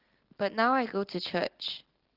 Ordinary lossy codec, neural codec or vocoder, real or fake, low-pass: Opus, 16 kbps; none; real; 5.4 kHz